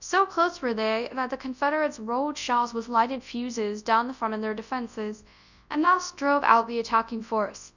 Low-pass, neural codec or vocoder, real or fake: 7.2 kHz; codec, 24 kHz, 0.9 kbps, WavTokenizer, large speech release; fake